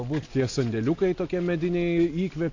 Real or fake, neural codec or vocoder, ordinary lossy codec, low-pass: real; none; AAC, 48 kbps; 7.2 kHz